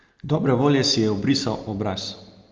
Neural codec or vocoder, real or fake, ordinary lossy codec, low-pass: none; real; Opus, 24 kbps; 7.2 kHz